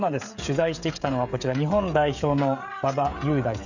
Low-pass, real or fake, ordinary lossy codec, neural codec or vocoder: 7.2 kHz; fake; none; codec, 16 kHz, 16 kbps, FreqCodec, smaller model